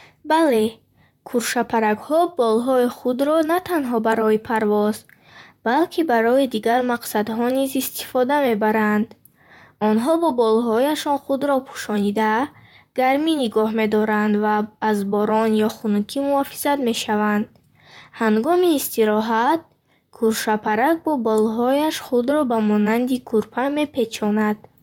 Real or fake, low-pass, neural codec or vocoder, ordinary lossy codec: fake; 19.8 kHz; vocoder, 44.1 kHz, 128 mel bands, Pupu-Vocoder; none